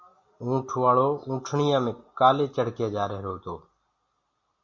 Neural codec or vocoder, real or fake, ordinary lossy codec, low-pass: none; real; Opus, 64 kbps; 7.2 kHz